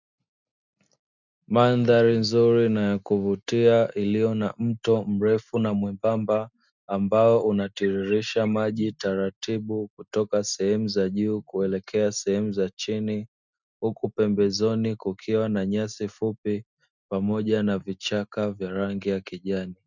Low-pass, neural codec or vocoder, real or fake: 7.2 kHz; none; real